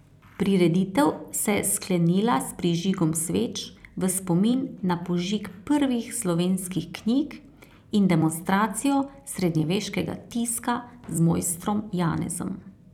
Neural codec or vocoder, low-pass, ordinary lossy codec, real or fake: vocoder, 44.1 kHz, 128 mel bands every 256 samples, BigVGAN v2; 19.8 kHz; none; fake